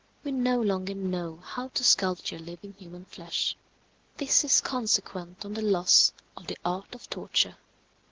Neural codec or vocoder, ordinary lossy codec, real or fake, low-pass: none; Opus, 16 kbps; real; 7.2 kHz